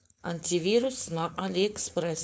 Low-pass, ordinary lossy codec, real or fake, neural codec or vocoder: none; none; fake; codec, 16 kHz, 4.8 kbps, FACodec